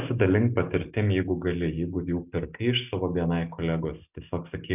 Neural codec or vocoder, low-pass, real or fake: none; 3.6 kHz; real